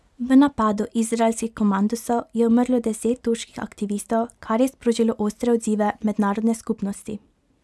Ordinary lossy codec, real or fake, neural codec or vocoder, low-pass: none; real; none; none